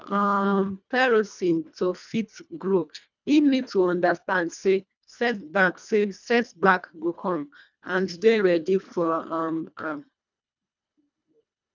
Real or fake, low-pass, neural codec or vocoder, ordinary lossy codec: fake; 7.2 kHz; codec, 24 kHz, 1.5 kbps, HILCodec; none